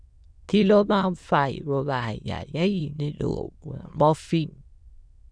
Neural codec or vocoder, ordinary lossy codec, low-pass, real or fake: autoencoder, 22.05 kHz, a latent of 192 numbers a frame, VITS, trained on many speakers; none; 9.9 kHz; fake